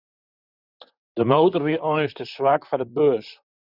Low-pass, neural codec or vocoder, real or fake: 5.4 kHz; vocoder, 44.1 kHz, 128 mel bands, Pupu-Vocoder; fake